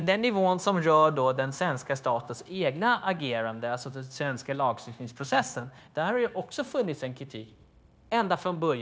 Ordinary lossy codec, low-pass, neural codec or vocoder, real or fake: none; none; codec, 16 kHz, 0.9 kbps, LongCat-Audio-Codec; fake